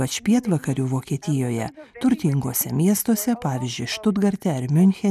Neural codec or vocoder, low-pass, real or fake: none; 14.4 kHz; real